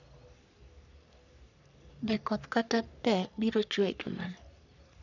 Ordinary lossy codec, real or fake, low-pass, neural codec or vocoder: none; fake; 7.2 kHz; codec, 44.1 kHz, 3.4 kbps, Pupu-Codec